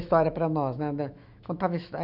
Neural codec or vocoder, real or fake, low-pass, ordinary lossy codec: autoencoder, 48 kHz, 128 numbers a frame, DAC-VAE, trained on Japanese speech; fake; 5.4 kHz; none